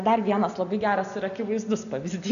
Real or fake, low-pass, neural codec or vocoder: real; 7.2 kHz; none